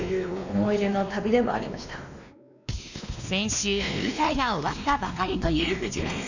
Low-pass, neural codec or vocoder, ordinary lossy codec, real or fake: 7.2 kHz; codec, 16 kHz, 2 kbps, X-Codec, WavLM features, trained on Multilingual LibriSpeech; none; fake